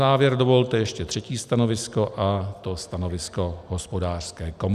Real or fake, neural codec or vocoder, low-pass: real; none; 14.4 kHz